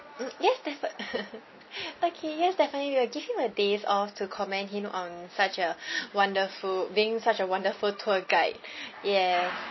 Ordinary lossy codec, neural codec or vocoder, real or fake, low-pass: MP3, 24 kbps; none; real; 7.2 kHz